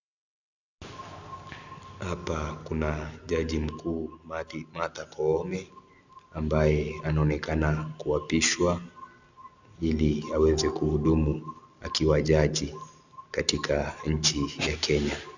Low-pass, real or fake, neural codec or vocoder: 7.2 kHz; real; none